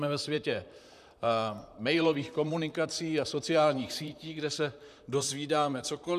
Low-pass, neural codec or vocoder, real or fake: 14.4 kHz; vocoder, 44.1 kHz, 128 mel bands, Pupu-Vocoder; fake